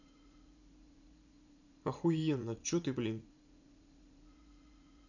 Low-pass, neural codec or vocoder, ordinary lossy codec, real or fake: 7.2 kHz; vocoder, 44.1 kHz, 80 mel bands, Vocos; none; fake